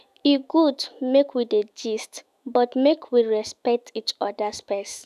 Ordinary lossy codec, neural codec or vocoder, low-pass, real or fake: none; autoencoder, 48 kHz, 128 numbers a frame, DAC-VAE, trained on Japanese speech; 14.4 kHz; fake